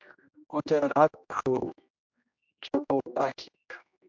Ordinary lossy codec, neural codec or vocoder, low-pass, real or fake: MP3, 64 kbps; codec, 16 kHz, 1 kbps, X-Codec, HuBERT features, trained on general audio; 7.2 kHz; fake